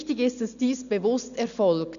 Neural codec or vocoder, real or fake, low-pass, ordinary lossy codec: none; real; 7.2 kHz; none